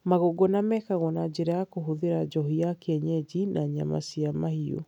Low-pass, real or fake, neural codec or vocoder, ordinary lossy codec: 19.8 kHz; real; none; none